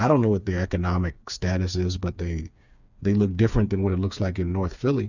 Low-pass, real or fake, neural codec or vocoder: 7.2 kHz; fake; codec, 16 kHz, 4 kbps, FreqCodec, smaller model